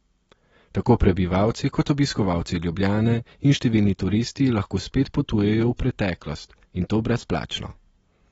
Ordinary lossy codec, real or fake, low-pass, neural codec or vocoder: AAC, 24 kbps; real; 19.8 kHz; none